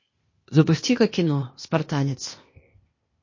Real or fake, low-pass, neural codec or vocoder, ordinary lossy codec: fake; 7.2 kHz; codec, 16 kHz, 0.8 kbps, ZipCodec; MP3, 32 kbps